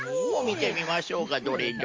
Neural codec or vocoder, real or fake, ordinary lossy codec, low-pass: none; real; Opus, 32 kbps; 7.2 kHz